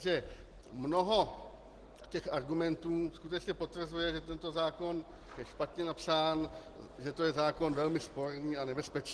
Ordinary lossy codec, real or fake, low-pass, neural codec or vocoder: Opus, 16 kbps; real; 10.8 kHz; none